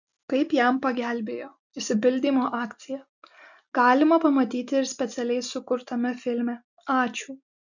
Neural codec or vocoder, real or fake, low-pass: none; real; 7.2 kHz